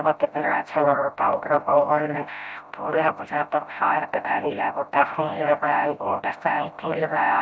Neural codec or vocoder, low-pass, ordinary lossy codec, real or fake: codec, 16 kHz, 0.5 kbps, FreqCodec, smaller model; none; none; fake